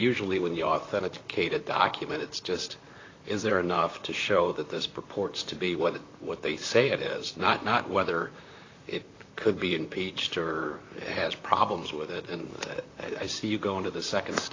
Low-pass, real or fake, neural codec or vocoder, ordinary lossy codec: 7.2 kHz; fake; vocoder, 44.1 kHz, 128 mel bands, Pupu-Vocoder; AAC, 32 kbps